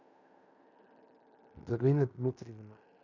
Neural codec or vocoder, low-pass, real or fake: codec, 16 kHz in and 24 kHz out, 0.9 kbps, LongCat-Audio-Codec, fine tuned four codebook decoder; 7.2 kHz; fake